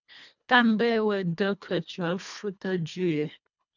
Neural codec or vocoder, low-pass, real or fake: codec, 24 kHz, 1.5 kbps, HILCodec; 7.2 kHz; fake